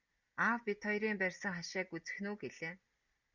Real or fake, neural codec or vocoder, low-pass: real; none; 7.2 kHz